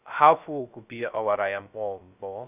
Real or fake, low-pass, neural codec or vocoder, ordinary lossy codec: fake; 3.6 kHz; codec, 16 kHz, 0.2 kbps, FocalCodec; none